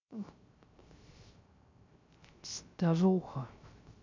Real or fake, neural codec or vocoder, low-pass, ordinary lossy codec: fake; codec, 16 kHz, 0.3 kbps, FocalCodec; 7.2 kHz; MP3, 64 kbps